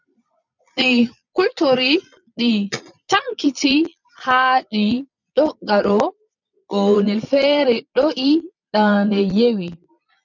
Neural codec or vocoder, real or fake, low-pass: vocoder, 22.05 kHz, 80 mel bands, Vocos; fake; 7.2 kHz